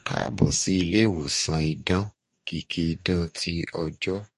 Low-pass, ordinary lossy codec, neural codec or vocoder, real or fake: 14.4 kHz; MP3, 48 kbps; codec, 44.1 kHz, 2.6 kbps, DAC; fake